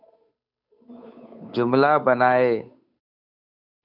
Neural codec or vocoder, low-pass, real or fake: codec, 16 kHz, 8 kbps, FunCodec, trained on Chinese and English, 25 frames a second; 5.4 kHz; fake